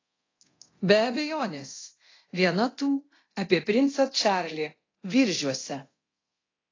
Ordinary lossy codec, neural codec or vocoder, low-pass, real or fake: AAC, 32 kbps; codec, 24 kHz, 0.9 kbps, DualCodec; 7.2 kHz; fake